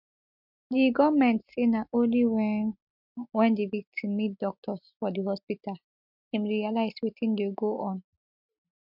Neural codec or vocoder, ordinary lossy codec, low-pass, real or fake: none; MP3, 48 kbps; 5.4 kHz; real